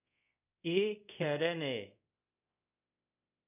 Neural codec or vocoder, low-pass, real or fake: codec, 24 kHz, 0.5 kbps, DualCodec; 3.6 kHz; fake